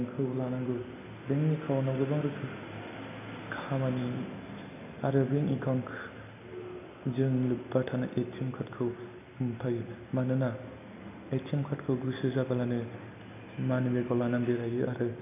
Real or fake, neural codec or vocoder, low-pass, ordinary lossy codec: real; none; 3.6 kHz; none